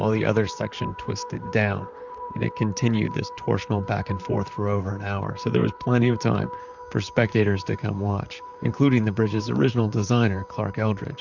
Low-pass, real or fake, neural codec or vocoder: 7.2 kHz; fake; vocoder, 44.1 kHz, 128 mel bands, Pupu-Vocoder